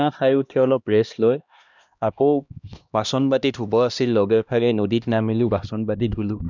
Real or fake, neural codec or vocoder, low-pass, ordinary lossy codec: fake; codec, 16 kHz, 1 kbps, X-Codec, HuBERT features, trained on LibriSpeech; 7.2 kHz; none